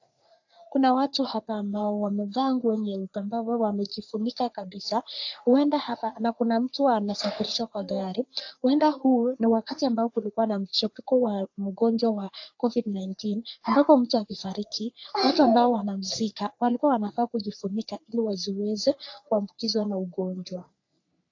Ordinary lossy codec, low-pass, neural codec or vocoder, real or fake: AAC, 48 kbps; 7.2 kHz; codec, 44.1 kHz, 3.4 kbps, Pupu-Codec; fake